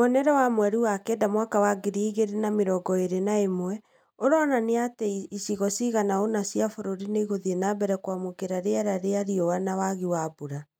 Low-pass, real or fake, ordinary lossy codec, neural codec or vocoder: 19.8 kHz; real; none; none